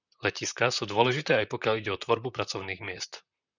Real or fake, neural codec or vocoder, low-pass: fake; vocoder, 44.1 kHz, 128 mel bands, Pupu-Vocoder; 7.2 kHz